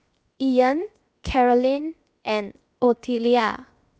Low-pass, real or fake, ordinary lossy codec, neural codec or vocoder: none; fake; none; codec, 16 kHz, 0.7 kbps, FocalCodec